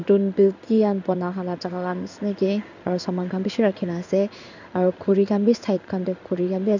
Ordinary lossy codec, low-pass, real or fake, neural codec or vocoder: none; 7.2 kHz; fake; codec, 16 kHz in and 24 kHz out, 1 kbps, XY-Tokenizer